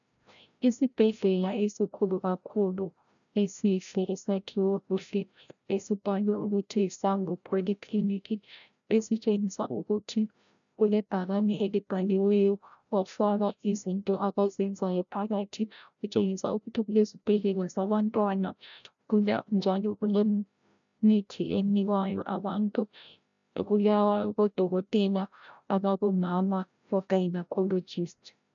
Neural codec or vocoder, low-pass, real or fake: codec, 16 kHz, 0.5 kbps, FreqCodec, larger model; 7.2 kHz; fake